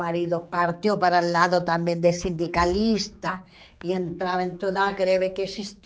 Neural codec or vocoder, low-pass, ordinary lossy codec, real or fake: codec, 16 kHz, 4 kbps, X-Codec, HuBERT features, trained on general audio; none; none; fake